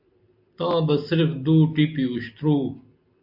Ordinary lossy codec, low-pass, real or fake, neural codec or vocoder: AAC, 48 kbps; 5.4 kHz; real; none